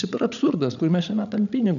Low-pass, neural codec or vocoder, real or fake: 7.2 kHz; codec, 16 kHz, 16 kbps, FreqCodec, larger model; fake